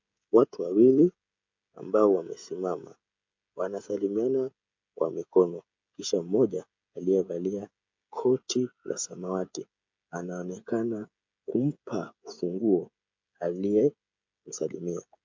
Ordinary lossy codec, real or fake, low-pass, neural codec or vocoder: MP3, 48 kbps; fake; 7.2 kHz; codec, 16 kHz, 16 kbps, FreqCodec, smaller model